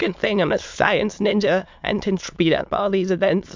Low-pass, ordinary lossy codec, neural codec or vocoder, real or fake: 7.2 kHz; MP3, 64 kbps; autoencoder, 22.05 kHz, a latent of 192 numbers a frame, VITS, trained on many speakers; fake